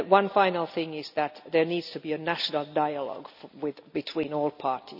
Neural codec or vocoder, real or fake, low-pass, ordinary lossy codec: none; real; 5.4 kHz; none